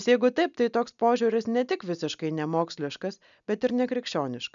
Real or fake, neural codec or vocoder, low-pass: real; none; 7.2 kHz